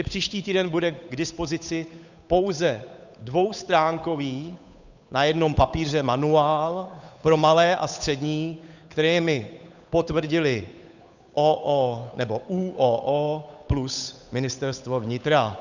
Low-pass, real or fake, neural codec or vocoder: 7.2 kHz; fake; codec, 16 kHz, 8 kbps, FunCodec, trained on Chinese and English, 25 frames a second